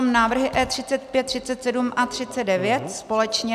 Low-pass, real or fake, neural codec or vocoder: 14.4 kHz; real; none